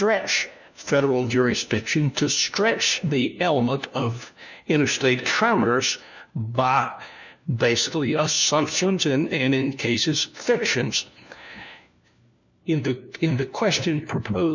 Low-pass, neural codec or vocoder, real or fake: 7.2 kHz; codec, 16 kHz, 1 kbps, FunCodec, trained on LibriTTS, 50 frames a second; fake